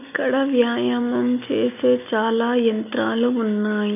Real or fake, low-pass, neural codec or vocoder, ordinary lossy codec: real; 3.6 kHz; none; none